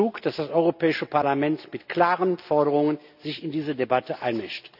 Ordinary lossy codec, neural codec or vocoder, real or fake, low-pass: none; none; real; 5.4 kHz